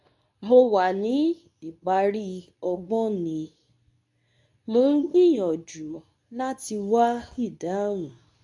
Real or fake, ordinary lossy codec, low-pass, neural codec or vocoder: fake; none; 10.8 kHz; codec, 24 kHz, 0.9 kbps, WavTokenizer, medium speech release version 2